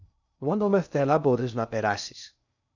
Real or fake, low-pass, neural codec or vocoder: fake; 7.2 kHz; codec, 16 kHz in and 24 kHz out, 0.6 kbps, FocalCodec, streaming, 2048 codes